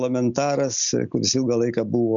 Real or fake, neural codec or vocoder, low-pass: real; none; 7.2 kHz